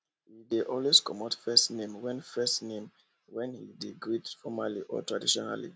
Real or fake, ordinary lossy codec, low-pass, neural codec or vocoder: real; none; none; none